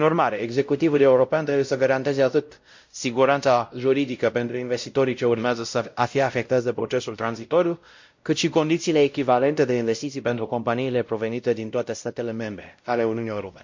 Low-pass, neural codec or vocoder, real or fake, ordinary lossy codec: 7.2 kHz; codec, 16 kHz, 0.5 kbps, X-Codec, WavLM features, trained on Multilingual LibriSpeech; fake; MP3, 48 kbps